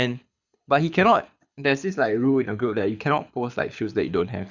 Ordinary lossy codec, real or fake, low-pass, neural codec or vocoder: none; fake; 7.2 kHz; codec, 24 kHz, 6 kbps, HILCodec